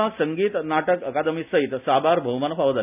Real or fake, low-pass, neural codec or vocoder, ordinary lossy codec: real; 3.6 kHz; none; none